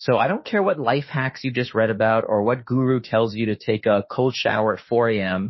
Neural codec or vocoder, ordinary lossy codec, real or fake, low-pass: autoencoder, 48 kHz, 32 numbers a frame, DAC-VAE, trained on Japanese speech; MP3, 24 kbps; fake; 7.2 kHz